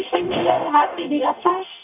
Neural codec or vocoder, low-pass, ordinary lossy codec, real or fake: codec, 44.1 kHz, 0.9 kbps, DAC; 3.6 kHz; none; fake